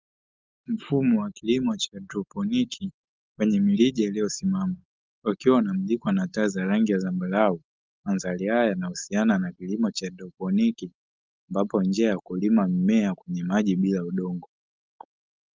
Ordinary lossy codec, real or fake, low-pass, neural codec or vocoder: Opus, 32 kbps; real; 7.2 kHz; none